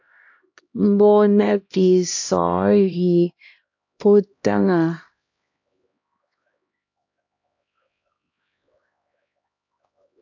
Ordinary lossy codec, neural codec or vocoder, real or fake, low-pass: AAC, 48 kbps; codec, 16 kHz, 1 kbps, X-Codec, HuBERT features, trained on LibriSpeech; fake; 7.2 kHz